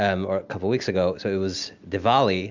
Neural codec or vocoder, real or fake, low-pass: none; real; 7.2 kHz